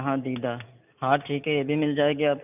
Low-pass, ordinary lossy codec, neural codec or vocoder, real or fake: 3.6 kHz; none; none; real